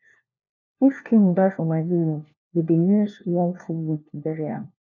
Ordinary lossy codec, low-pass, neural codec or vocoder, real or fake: none; 7.2 kHz; codec, 16 kHz, 1 kbps, FunCodec, trained on LibriTTS, 50 frames a second; fake